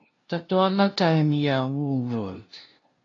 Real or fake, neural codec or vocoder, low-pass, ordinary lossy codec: fake; codec, 16 kHz, 0.5 kbps, FunCodec, trained on LibriTTS, 25 frames a second; 7.2 kHz; AAC, 32 kbps